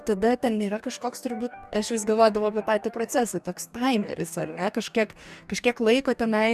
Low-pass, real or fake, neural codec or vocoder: 14.4 kHz; fake; codec, 44.1 kHz, 2.6 kbps, DAC